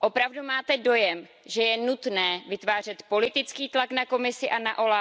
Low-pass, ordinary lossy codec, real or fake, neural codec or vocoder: none; none; real; none